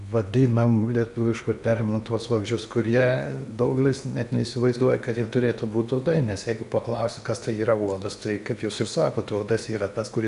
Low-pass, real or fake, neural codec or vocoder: 10.8 kHz; fake; codec, 16 kHz in and 24 kHz out, 0.8 kbps, FocalCodec, streaming, 65536 codes